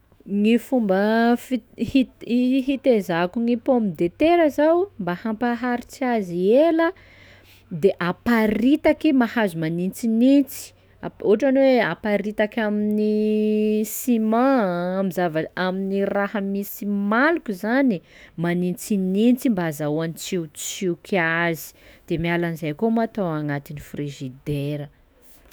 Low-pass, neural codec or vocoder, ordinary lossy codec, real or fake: none; autoencoder, 48 kHz, 128 numbers a frame, DAC-VAE, trained on Japanese speech; none; fake